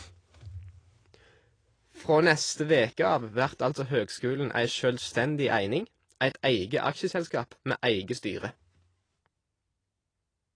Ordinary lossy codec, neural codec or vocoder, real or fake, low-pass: AAC, 32 kbps; none; real; 9.9 kHz